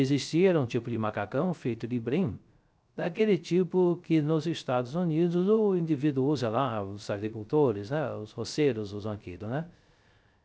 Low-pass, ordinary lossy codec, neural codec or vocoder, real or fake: none; none; codec, 16 kHz, 0.3 kbps, FocalCodec; fake